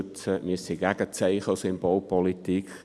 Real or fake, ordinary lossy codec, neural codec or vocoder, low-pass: real; none; none; none